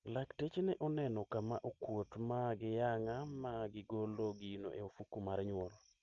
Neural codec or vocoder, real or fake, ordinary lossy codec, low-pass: none; real; Opus, 24 kbps; 7.2 kHz